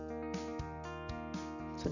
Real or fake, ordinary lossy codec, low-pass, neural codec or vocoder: real; none; 7.2 kHz; none